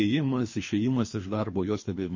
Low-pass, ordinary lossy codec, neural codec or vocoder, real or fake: 7.2 kHz; MP3, 32 kbps; codec, 24 kHz, 3 kbps, HILCodec; fake